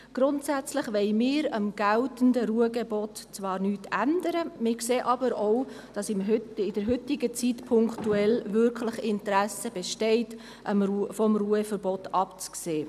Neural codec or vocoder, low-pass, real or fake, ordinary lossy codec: none; 14.4 kHz; real; none